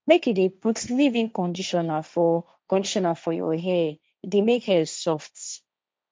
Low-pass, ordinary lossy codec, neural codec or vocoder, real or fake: none; none; codec, 16 kHz, 1.1 kbps, Voila-Tokenizer; fake